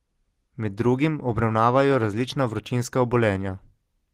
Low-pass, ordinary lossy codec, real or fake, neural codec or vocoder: 14.4 kHz; Opus, 16 kbps; real; none